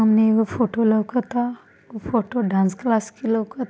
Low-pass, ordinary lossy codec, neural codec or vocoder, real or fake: none; none; none; real